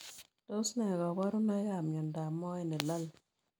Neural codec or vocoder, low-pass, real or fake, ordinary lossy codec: none; none; real; none